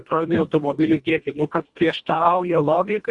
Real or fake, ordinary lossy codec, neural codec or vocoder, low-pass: fake; Opus, 64 kbps; codec, 24 kHz, 1.5 kbps, HILCodec; 10.8 kHz